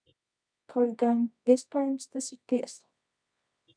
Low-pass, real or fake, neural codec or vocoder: 9.9 kHz; fake; codec, 24 kHz, 0.9 kbps, WavTokenizer, medium music audio release